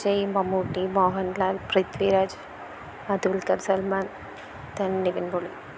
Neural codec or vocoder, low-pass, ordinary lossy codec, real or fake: none; none; none; real